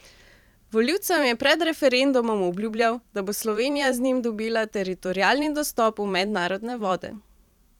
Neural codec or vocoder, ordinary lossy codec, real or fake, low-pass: vocoder, 44.1 kHz, 128 mel bands every 512 samples, BigVGAN v2; none; fake; 19.8 kHz